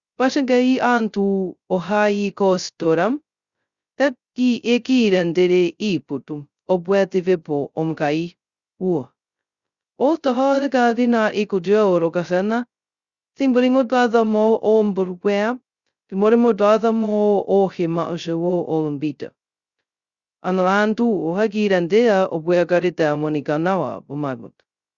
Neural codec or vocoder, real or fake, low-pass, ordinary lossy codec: codec, 16 kHz, 0.2 kbps, FocalCodec; fake; 7.2 kHz; Opus, 64 kbps